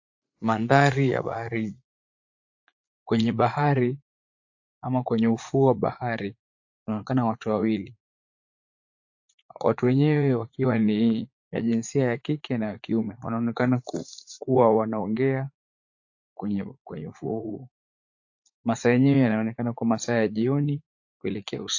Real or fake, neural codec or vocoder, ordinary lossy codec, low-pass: fake; vocoder, 44.1 kHz, 80 mel bands, Vocos; AAC, 48 kbps; 7.2 kHz